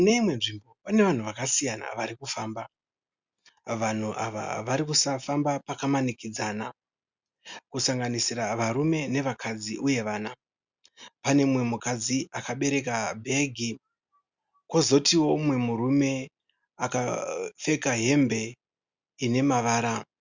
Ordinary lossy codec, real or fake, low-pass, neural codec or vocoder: Opus, 64 kbps; real; 7.2 kHz; none